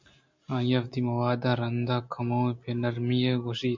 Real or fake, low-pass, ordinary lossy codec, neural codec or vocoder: real; 7.2 kHz; MP3, 48 kbps; none